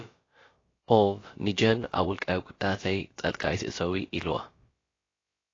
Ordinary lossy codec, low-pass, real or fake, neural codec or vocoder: AAC, 32 kbps; 7.2 kHz; fake; codec, 16 kHz, about 1 kbps, DyCAST, with the encoder's durations